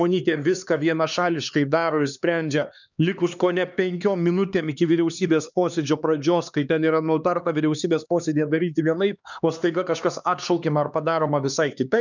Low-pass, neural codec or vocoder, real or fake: 7.2 kHz; codec, 16 kHz, 2 kbps, X-Codec, HuBERT features, trained on LibriSpeech; fake